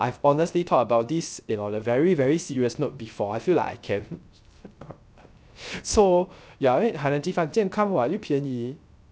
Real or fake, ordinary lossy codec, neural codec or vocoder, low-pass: fake; none; codec, 16 kHz, 0.3 kbps, FocalCodec; none